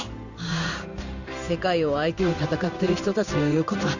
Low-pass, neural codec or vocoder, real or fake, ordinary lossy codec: 7.2 kHz; codec, 16 kHz in and 24 kHz out, 1 kbps, XY-Tokenizer; fake; none